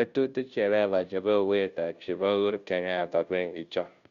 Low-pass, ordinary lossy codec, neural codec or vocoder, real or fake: 7.2 kHz; Opus, 64 kbps; codec, 16 kHz, 0.5 kbps, FunCodec, trained on Chinese and English, 25 frames a second; fake